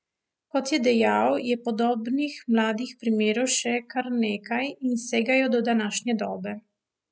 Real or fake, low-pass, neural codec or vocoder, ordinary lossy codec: real; none; none; none